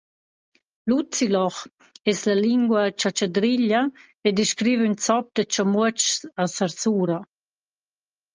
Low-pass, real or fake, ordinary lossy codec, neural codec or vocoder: 7.2 kHz; real; Opus, 24 kbps; none